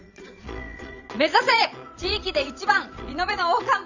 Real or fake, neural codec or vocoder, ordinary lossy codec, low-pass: fake; vocoder, 22.05 kHz, 80 mel bands, Vocos; none; 7.2 kHz